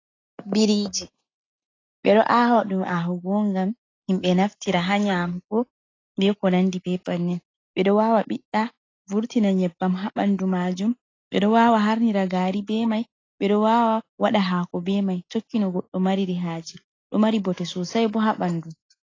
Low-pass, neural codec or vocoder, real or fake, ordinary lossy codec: 7.2 kHz; none; real; AAC, 32 kbps